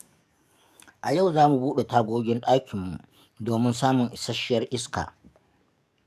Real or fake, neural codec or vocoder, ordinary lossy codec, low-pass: fake; codec, 44.1 kHz, 7.8 kbps, DAC; none; 14.4 kHz